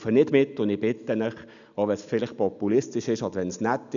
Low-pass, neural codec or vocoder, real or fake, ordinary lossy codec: 7.2 kHz; none; real; none